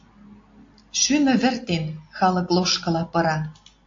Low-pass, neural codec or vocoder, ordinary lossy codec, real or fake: 7.2 kHz; none; AAC, 48 kbps; real